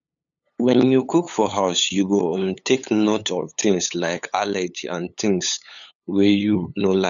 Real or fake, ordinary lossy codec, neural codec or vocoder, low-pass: fake; none; codec, 16 kHz, 8 kbps, FunCodec, trained on LibriTTS, 25 frames a second; 7.2 kHz